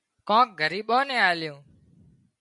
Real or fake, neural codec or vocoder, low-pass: real; none; 10.8 kHz